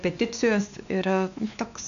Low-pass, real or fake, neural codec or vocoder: 7.2 kHz; fake; codec, 16 kHz, 2 kbps, X-Codec, WavLM features, trained on Multilingual LibriSpeech